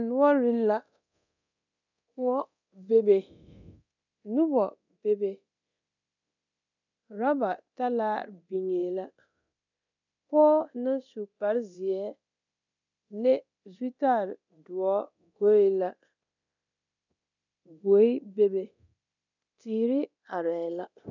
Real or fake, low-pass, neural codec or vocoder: fake; 7.2 kHz; codec, 24 kHz, 0.9 kbps, DualCodec